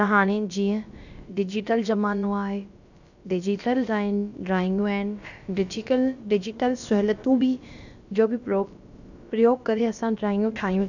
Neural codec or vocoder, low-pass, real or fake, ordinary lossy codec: codec, 16 kHz, about 1 kbps, DyCAST, with the encoder's durations; 7.2 kHz; fake; none